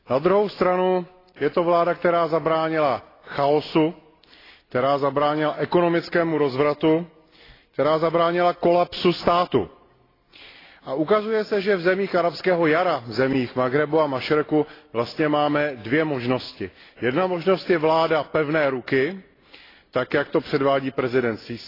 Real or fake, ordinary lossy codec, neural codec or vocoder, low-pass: real; AAC, 24 kbps; none; 5.4 kHz